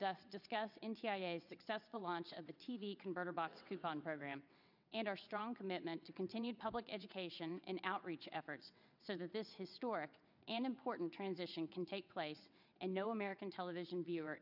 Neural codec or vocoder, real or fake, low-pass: vocoder, 22.05 kHz, 80 mel bands, WaveNeXt; fake; 5.4 kHz